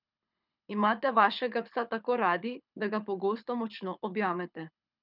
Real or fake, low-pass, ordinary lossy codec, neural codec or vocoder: fake; 5.4 kHz; none; codec, 24 kHz, 6 kbps, HILCodec